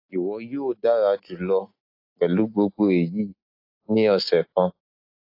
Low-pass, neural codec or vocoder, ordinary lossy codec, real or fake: 5.4 kHz; none; AAC, 32 kbps; real